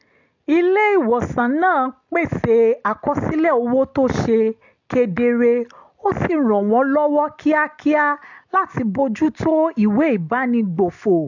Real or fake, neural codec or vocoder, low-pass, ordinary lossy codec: real; none; 7.2 kHz; AAC, 48 kbps